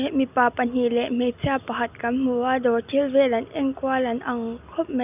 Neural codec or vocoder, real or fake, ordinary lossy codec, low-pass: none; real; none; 3.6 kHz